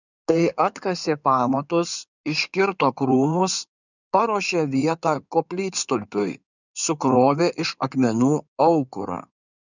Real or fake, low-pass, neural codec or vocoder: fake; 7.2 kHz; codec, 16 kHz in and 24 kHz out, 2.2 kbps, FireRedTTS-2 codec